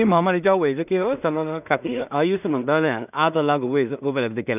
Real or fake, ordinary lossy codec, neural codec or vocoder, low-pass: fake; none; codec, 16 kHz in and 24 kHz out, 0.4 kbps, LongCat-Audio-Codec, two codebook decoder; 3.6 kHz